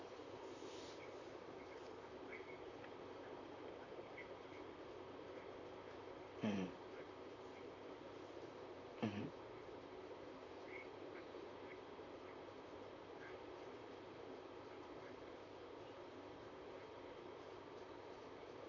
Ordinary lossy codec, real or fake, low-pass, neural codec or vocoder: AAC, 48 kbps; fake; 7.2 kHz; vocoder, 44.1 kHz, 128 mel bands, Pupu-Vocoder